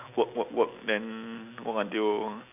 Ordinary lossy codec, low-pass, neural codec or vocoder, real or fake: none; 3.6 kHz; none; real